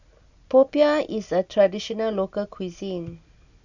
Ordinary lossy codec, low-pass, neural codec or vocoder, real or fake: none; 7.2 kHz; none; real